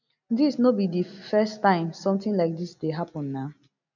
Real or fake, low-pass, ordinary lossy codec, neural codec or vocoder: real; 7.2 kHz; none; none